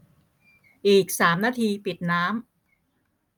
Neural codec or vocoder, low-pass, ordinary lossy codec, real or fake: none; none; none; real